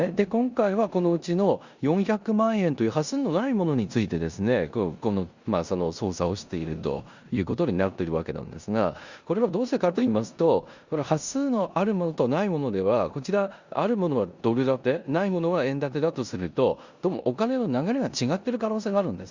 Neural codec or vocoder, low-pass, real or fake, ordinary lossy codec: codec, 16 kHz in and 24 kHz out, 0.9 kbps, LongCat-Audio-Codec, four codebook decoder; 7.2 kHz; fake; Opus, 64 kbps